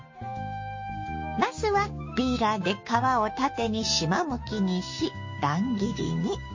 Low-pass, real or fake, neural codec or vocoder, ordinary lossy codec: 7.2 kHz; fake; codec, 16 kHz, 6 kbps, DAC; MP3, 32 kbps